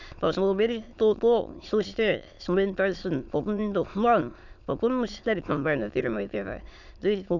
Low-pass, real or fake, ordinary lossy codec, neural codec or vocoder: 7.2 kHz; fake; none; autoencoder, 22.05 kHz, a latent of 192 numbers a frame, VITS, trained on many speakers